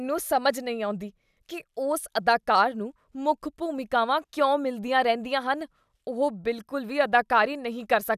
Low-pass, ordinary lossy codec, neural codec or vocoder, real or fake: 14.4 kHz; none; none; real